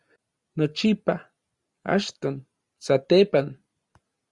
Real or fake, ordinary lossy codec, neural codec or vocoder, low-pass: real; Opus, 64 kbps; none; 10.8 kHz